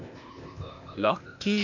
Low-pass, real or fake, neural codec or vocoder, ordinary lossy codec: 7.2 kHz; fake; codec, 16 kHz, 0.8 kbps, ZipCodec; none